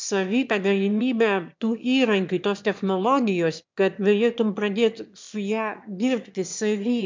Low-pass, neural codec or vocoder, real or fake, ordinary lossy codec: 7.2 kHz; autoencoder, 22.05 kHz, a latent of 192 numbers a frame, VITS, trained on one speaker; fake; MP3, 64 kbps